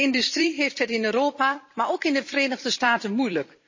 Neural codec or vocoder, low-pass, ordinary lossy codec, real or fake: vocoder, 44.1 kHz, 128 mel bands every 256 samples, BigVGAN v2; 7.2 kHz; MP3, 32 kbps; fake